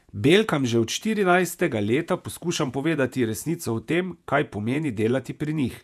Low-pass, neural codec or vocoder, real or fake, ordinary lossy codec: 14.4 kHz; vocoder, 48 kHz, 128 mel bands, Vocos; fake; none